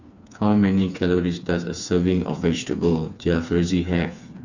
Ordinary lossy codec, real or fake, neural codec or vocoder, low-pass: none; fake; codec, 16 kHz, 4 kbps, FreqCodec, smaller model; 7.2 kHz